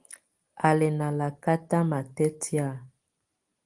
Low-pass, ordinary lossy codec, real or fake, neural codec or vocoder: 10.8 kHz; Opus, 24 kbps; real; none